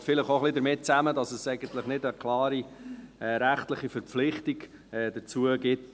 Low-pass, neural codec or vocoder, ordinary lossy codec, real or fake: none; none; none; real